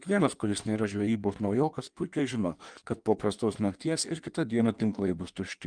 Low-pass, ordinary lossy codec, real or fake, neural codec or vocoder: 9.9 kHz; Opus, 32 kbps; fake; codec, 16 kHz in and 24 kHz out, 1.1 kbps, FireRedTTS-2 codec